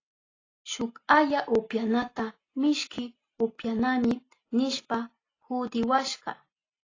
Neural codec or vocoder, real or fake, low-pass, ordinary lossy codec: none; real; 7.2 kHz; AAC, 32 kbps